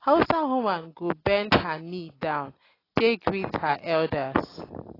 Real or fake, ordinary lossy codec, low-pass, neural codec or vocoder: real; AAC, 24 kbps; 5.4 kHz; none